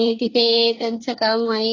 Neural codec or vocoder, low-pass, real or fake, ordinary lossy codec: codec, 24 kHz, 1 kbps, SNAC; 7.2 kHz; fake; AAC, 32 kbps